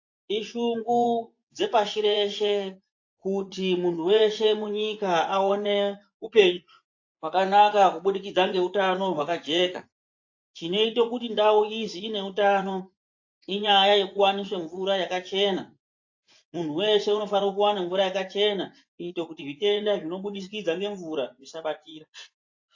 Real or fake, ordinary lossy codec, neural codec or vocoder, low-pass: fake; AAC, 48 kbps; vocoder, 24 kHz, 100 mel bands, Vocos; 7.2 kHz